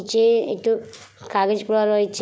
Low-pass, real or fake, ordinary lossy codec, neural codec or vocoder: none; real; none; none